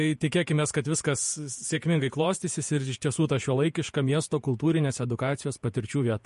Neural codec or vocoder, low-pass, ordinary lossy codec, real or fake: vocoder, 48 kHz, 128 mel bands, Vocos; 14.4 kHz; MP3, 48 kbps; fake